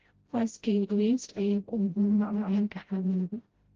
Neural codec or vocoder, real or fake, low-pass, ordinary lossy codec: codec, 16 kHz, 0.5 kbps, FreqCodec, smaller model; fake; 7.2 kHz; Opus, 16 kbps